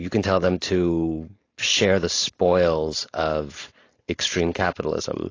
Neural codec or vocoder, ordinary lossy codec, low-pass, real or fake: codec, 16 kHz, 4.8 kbps, FACodec; AAC, 32 kbps; 7.2 kHz; fake